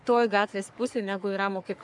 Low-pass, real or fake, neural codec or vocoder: 10.8 kHz; fake; codec, 44.1 kHz, 3.4 kbps, Pupu-Codec